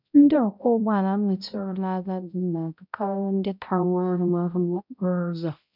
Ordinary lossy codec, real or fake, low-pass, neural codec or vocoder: none; fake; 5.4 kHz; codec, 16 kHz, 0.5 kbps, X-Codec, HuBERT features, trained on balanced general audio